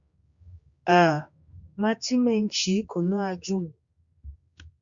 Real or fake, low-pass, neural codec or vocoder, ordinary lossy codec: fake; 7.2 kHz; codec, 16 kHz, 2 kbps, X-Codec, HuBERT features, trained on general audio; Opus, 64 kbps